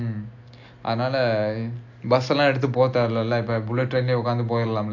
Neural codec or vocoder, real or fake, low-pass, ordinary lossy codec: none; real; 7.2 kHz; AAC, 48 kbps